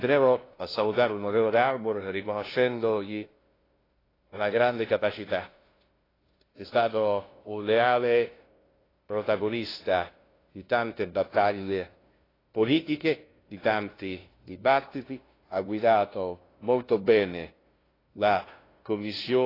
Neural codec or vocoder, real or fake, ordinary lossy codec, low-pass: codec, 16 kHz, 0.5 kbps, FunCodec, trained on LibriTTS, 25 frames a second; fake; AAC, 24 kbps; 5.4 kHz